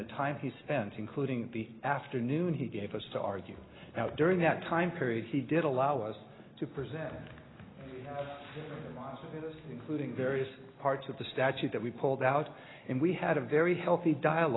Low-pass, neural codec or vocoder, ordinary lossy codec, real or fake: 7.2 kHz; none; AAC, 16 kbps; real